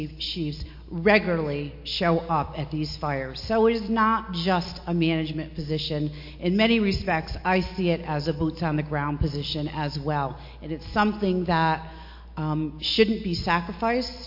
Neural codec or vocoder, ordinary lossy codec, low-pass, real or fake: none; MP3, 32 kbps; 5.4 kHz; real